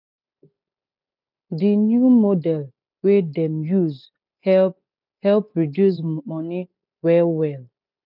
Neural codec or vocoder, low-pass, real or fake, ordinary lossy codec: none; 5.4 kHz; real; none